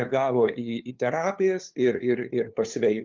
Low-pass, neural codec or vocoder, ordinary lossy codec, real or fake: 7.2 kHz; codec, 16 kHz, 2 kbps, FunCodec, trained on LibriTTS, 25 frames a second; Opus, 32 kbps; fake